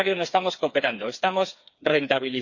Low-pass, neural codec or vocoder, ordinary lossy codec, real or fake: 7.2 kHz; codec, 16 kHz, 4 kbps, FreqCodec, smaller model; Opus, 64 kbps; fake